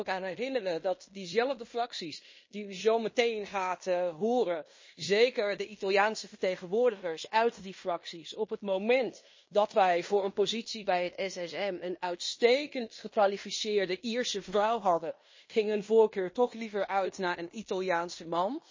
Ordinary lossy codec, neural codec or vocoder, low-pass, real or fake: MP3, 32 kbps; codec, 16 kHz in and 24 kHz out, 0.9 kbps, LongCat-Audio-Codec, fine tuned four codebook decoder; 7.2 kHz; fake